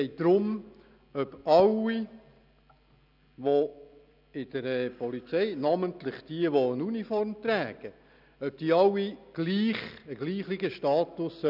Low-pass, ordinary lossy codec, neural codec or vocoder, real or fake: 5.4 kHz; none; none; real